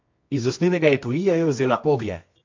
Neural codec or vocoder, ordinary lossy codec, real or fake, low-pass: codec, 24 kHz, 0.9 kbps, WavTokenizer, medium music audio release; MP3, 48 kbps; fake; 7.2 kHz